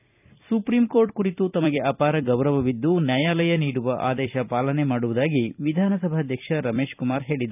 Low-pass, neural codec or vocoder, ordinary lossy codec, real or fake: 3.6 kHz; vocoder, 44.1 kHz, 128 mel bands every 256 samples, BigVGAN v2; none; fake